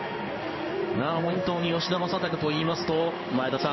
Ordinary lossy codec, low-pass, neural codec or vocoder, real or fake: MP3, 24 kbps; 7.2 kHz; codec, 16 kHz in and 24 kHz out, 1 kbps, XY-Tokenizer; fake